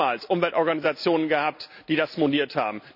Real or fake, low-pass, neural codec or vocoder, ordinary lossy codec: real; 5.4 kHz; none; none